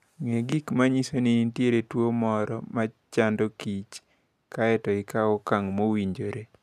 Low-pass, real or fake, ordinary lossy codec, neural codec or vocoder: 14.4 kHz; real; none; none